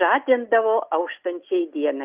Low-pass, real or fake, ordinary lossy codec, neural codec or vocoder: 3.6 kHz; real; Opus, 32 kbps; none